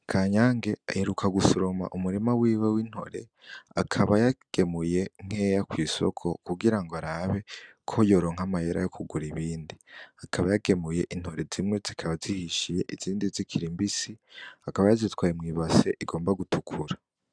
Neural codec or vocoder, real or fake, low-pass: none; real; 9.9 kHz